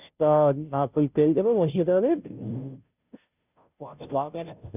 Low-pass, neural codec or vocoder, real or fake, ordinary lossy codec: 3.6 kHz; codec, 16 kHz, 0.5 kbps, FunCodec, trained on Chinese and English, 25 frames a second; fake; none